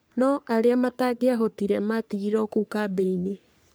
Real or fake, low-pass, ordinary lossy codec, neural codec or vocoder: fake; none; none; codec, 44.1 kHz, 3.4 kbps, Pupu-Codec